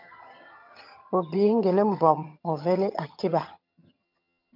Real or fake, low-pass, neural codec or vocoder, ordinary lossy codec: fake; 5.4 kHz; vocoder, 22.05 kHz, 80 mel bands, HiFi-GAN; MP3, 48 kbps